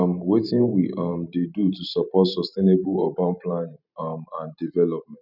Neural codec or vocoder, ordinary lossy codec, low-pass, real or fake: vocoder, 44.1 kHz, 128 mel bands every 512 samples, BigVGAN v2; none; 5.4 kHz; fake